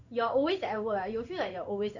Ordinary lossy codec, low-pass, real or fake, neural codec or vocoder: none; 7.2 kHz; fake; codec, 16 kHz in and 24 kHz out, 1 kbps, XY-Tokenizer